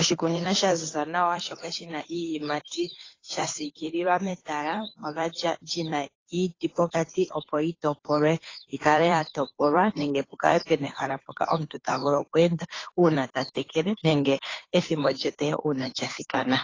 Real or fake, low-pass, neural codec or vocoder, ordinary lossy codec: fake; 7.2 kHz; codec, 16 kHz, 2 kbps, FunCodec, trained on Chinese and English, 25 frames a second; AAC, 32 kbps